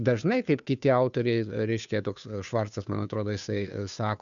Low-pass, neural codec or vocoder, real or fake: 7.2 kHz; codec, 16 kHz, 2 kbps, FunCodec, trained on Chinese and English, 25 frames a second; fake